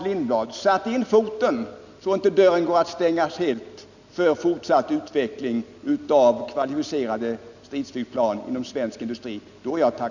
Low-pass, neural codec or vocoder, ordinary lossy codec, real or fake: 7.2 kHz; none; none; real